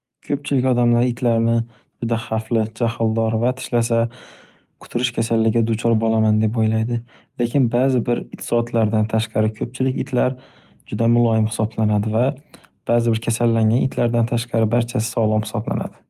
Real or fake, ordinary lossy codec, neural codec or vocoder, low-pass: real; Opus, 32 kbps; none; 14.4 kHz